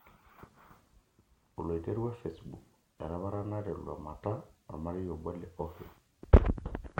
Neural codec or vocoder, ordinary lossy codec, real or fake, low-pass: none; MP3, 64 kbps; real; 19.8 kHz